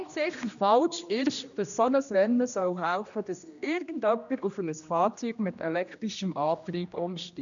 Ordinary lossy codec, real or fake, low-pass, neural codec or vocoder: none; fake; 7.2 kHz; codec, 16 kHz, 1 kbps, X-Codec, HuBERT features, trained on general audio